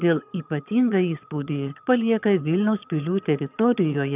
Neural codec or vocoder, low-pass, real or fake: vocoder, 22.05 kHz, 80 mel bands, HiFi-GAN; 3.6 kHz; fake